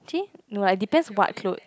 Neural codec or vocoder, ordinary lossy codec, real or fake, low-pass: none; none; real; none